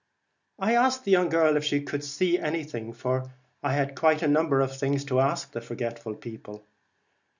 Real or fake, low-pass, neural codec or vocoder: real; 7.2 kHz; none